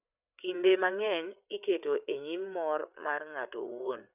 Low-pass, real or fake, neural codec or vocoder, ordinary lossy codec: 3.6 kHz; fake; codec, 16 kHz, 8 kbps, FreqCodec, larger model; none